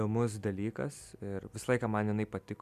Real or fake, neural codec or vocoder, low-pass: real; none; 14.4 kHz